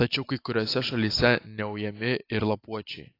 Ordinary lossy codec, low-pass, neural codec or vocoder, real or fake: AAC, 32 kbps; 5.4 kHz; none; real